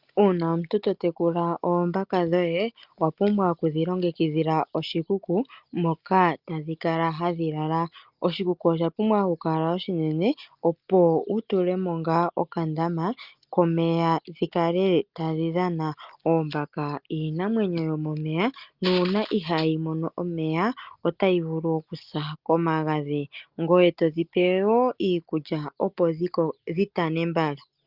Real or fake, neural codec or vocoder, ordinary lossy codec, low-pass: real; none; Opus, 24 kbps; 5.4 kHz